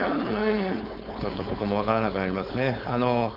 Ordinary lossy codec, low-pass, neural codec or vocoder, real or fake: none; 5.4 kHz; codec, 16 kHz, 4.8 kbps, FACodec; fake